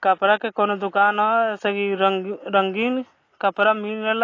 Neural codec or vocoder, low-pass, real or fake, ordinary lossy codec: none; 7.2 kHz; real; AAC, 32 kbps